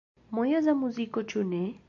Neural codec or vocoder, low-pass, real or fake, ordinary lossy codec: none; 7.2 kHz; real; AAC, 64 kbps